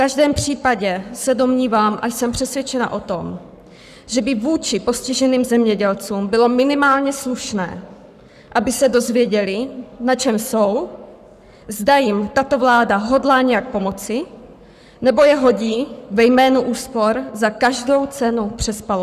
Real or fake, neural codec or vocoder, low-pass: fake; codec, 44.1 kHz, 7.8 kbps, Pupu-Codec; 14.4 kHz